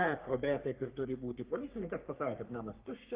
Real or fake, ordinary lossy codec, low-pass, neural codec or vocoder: fake; Opus, 32 kbps; 3.6 kHz; codec, 44.1 kHz, 3.4 kbps, Pupu-Codec